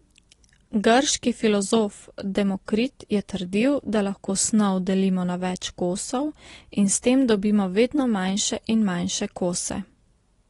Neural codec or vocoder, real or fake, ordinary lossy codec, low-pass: none; real; AAC, 32 kbps; 10.8 kHz